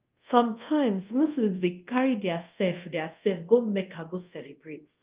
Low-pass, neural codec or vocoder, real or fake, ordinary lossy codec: 3.6 kHz; codec, 24 kHz, 0.5 kbps, DualCodec; fake; Opus, 32 kbps